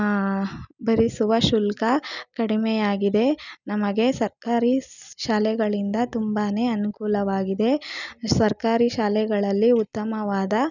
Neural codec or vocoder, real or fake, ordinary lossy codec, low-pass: none; real; none; 7.2 kHz